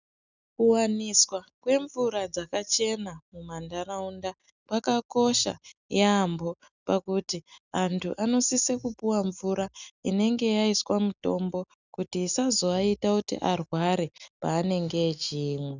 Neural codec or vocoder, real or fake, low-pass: none; real; 7.2 kHz